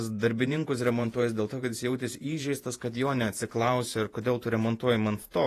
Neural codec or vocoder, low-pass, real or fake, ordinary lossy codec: vocoder, 48 kHz, 128 mel bands, Vocos; 14.4 kHz; fake; AAC, 48 kbps